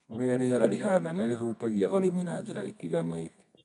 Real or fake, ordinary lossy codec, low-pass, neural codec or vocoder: fake; none; 10.8 kHz; codec, 24 kHz, 0.9 kbps, WavTokenizer, medium music audio release